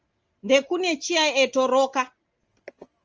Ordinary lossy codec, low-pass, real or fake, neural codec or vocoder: Opus, 24 kbps; 7.2 kHz; real; none